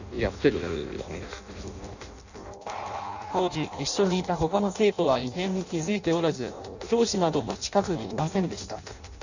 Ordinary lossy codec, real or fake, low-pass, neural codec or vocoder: none; fake; 7.2 kHz; codec, 16 kHz in and 24 kHz out, 0.6 kbps, FireRedTTS-2 codec